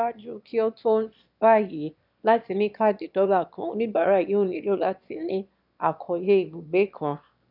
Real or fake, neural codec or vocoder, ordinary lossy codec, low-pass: fake; autoencoder, 22.05 kHz, a latent of 192 numbers a frame, VITS, trained on one speaker; none; 5.4 kHz